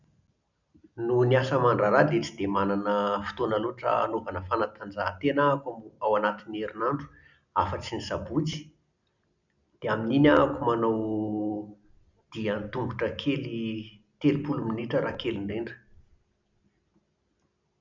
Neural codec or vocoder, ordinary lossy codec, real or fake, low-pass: none; none; real; 7.2 kHz